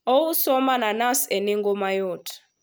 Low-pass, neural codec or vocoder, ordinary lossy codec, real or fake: none; none; none; real